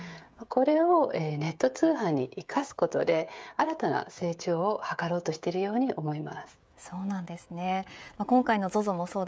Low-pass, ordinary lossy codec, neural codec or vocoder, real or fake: none; none; codec, 16 kHz, 16 kbps, FreqCodec, smaller model; fake